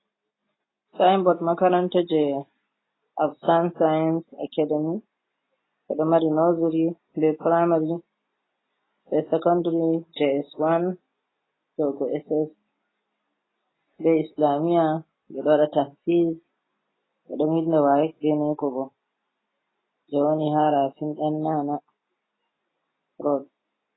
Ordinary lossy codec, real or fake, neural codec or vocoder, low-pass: AAC, 16 kbps; real; none; 7.2 kHz